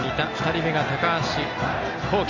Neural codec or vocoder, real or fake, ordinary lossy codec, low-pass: none; real; none; 7.2 kHz